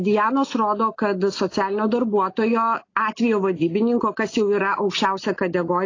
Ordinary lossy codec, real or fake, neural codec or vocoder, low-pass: AAC, 32 kbps; real; none; 7.2 kHz